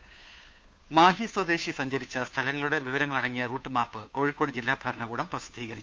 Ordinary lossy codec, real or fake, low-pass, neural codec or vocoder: none; fake; none; codec, 16 kHz, 2 kbps, FunCodec, trained on Chinese and English, 25 frames a second